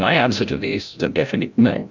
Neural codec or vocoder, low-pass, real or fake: codec, 16 kHz, 0.5 kbps, FreqCodec, larger model; 7.2 kHz; fake